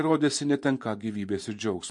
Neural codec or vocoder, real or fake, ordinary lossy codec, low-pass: vocoder, 24 kHz, 100 mel bands, Vocos; fake; MP3, 48 kbps; 10.8 kHz